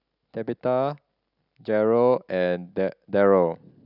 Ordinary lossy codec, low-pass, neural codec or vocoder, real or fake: none; 5.4 kHz; none; real